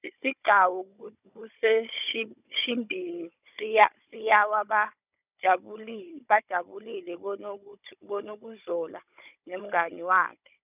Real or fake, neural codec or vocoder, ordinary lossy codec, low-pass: fake; codec, 16 kHz, 16 kbps, FunCodec, trained on Chinese and English, 50 frames a second; none; 3.6 kHz